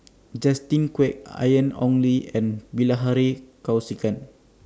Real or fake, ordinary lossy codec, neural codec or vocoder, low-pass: real; none; none; none